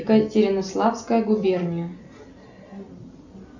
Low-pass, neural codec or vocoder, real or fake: 7.2 kHz; none; real